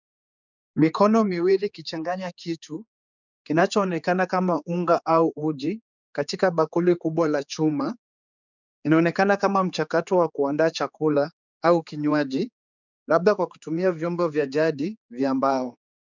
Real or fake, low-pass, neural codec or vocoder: fake; 7.2 kHz; codec, 16 kHz, 4 kbps, X-Codec, HuBERT features, trained on general audio